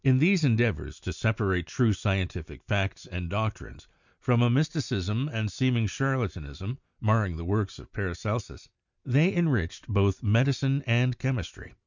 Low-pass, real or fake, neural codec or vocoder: 7.2 kHz; real; none